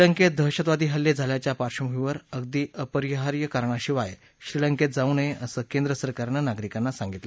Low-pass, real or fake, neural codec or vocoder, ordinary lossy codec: none; real; none; none